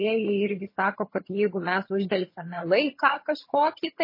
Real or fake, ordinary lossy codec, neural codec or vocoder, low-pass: fake; MP3, 24 kbps; vocoder, 22.05 kHz, 80 mel bands, HiFi-GAN; 5.4 kHz